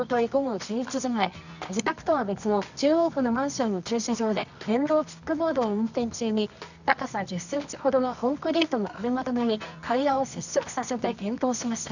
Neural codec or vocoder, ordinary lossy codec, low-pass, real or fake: codec, 24 kHz, 0.9 kbps, WavTokenizer, medium music audio release; none; 7.2 kHz; fake